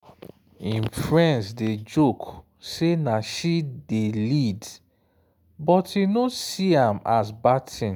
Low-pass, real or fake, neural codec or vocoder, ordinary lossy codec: none; real; none; none